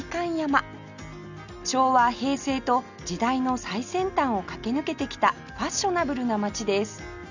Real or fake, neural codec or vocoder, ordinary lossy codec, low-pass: real; none; none; 7.2 kHz